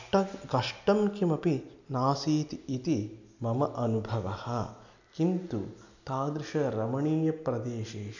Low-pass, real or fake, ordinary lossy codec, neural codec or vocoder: 7.2 kHz; real; none; none